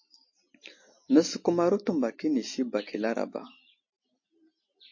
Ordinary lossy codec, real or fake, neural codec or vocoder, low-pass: MP3, 32 kbps; real; none; 7.2 kHz